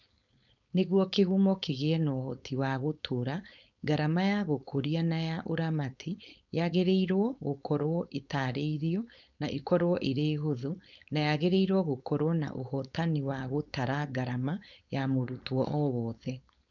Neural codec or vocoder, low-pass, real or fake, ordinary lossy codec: codec, 16 kHz, 4.8 kbps, FACodec; 7.2 kHz; fake; none